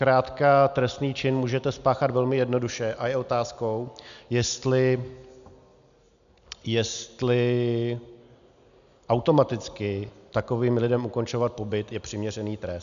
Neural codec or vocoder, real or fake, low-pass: none; real; 7.2 kHz